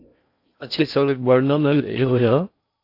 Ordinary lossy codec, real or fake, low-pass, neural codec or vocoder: AAC, 32 kbps; fake; 5.4 kHz; codec, 16 kHz in and 24 kHz out, 0.6 kbps, FocalCodec, streaming, 4096 codes